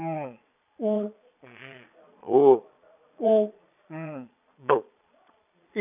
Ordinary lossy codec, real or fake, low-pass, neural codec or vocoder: none; fake; 3.6 kHz; vocoder, 22.05 kHz, 80 mel bands, Vocos